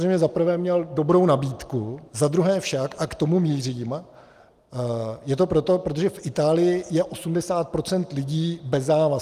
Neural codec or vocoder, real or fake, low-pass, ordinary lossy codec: none; real; 14.4 kHz; Opus, 32 kbps